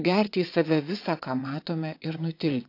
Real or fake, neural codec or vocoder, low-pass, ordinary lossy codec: fake; vocoder, 22.05 kHz, 80 mel bands, WaveNeXt; 5.4 kHz; AAC, 32 kbps